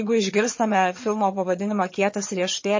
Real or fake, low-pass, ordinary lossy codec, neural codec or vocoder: fake; 7.2 kHz; MP3, 32 kbps; vocoder, 22.05 kHz, 80 mel bands, HiFi-GAN